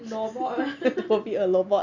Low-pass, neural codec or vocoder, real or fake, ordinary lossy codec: 7.2 kHz; none; real; Opus, 64 kbps